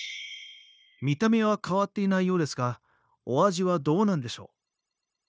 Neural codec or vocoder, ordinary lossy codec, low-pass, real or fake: codec, 16 kHz, 0.9 kbps, LongCat-Audio-Codec; none; none; fake